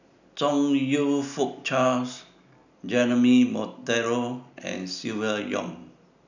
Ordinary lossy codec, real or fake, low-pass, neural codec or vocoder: none; real; 7.2 kHz; none